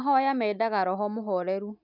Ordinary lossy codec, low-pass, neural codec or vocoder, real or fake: none; 5.4 kHz; none; real